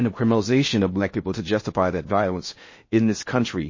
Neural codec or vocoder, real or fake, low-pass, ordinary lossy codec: codec, 16 kHz in and 24 kHz out, 0.6 kbps, FocalCodec, streaming, 4096 codes; fake; 7.2 kHz; MP3, 32 kbps